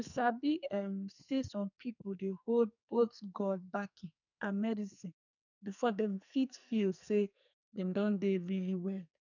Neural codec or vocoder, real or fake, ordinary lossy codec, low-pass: codec, 44.1 kHz, 2.6 kbps, SNAC; fake; none; 7.2 kHz